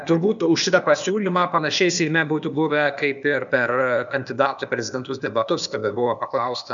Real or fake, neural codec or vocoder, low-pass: fake; codec, 16 kHz, 0.8 kbps, ZipCodec; 7.2 kHz